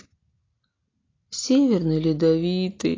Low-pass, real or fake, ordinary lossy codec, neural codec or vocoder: 7.2 kHz; real; AAC, 32 kbps; none